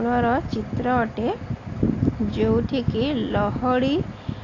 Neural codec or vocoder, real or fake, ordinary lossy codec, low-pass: none; real; AAC, 32 kbps; 7.2 kHz